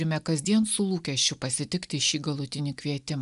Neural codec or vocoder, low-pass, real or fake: none; 10.8 kHz; real